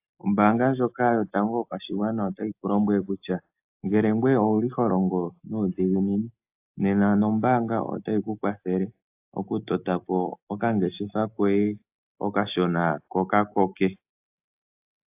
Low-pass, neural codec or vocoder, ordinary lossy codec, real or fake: 3.6 kHz; none; AAC, 32 kbps; real